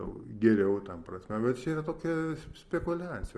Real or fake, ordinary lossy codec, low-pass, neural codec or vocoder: real; Opus, 24 kbps; 9.9 kHz; none